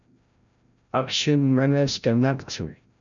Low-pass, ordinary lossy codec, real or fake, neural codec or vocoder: 7.2 kHz; MP3, 96 kbps; fake; codec, 16 kHz, 0.5 kbps, FreqCodec, larger model